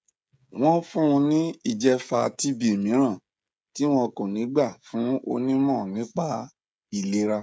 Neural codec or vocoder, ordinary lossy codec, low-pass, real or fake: codec, 16 kHz, 16 kbps, FreqCodec, smaller model; none; none; fake